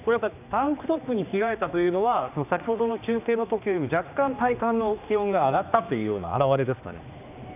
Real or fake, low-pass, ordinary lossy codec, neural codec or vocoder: fake; 3.6 kHz; none; codec, 16 kHz, 2 kbps, X-Codec, HuBERT features, trained on general audio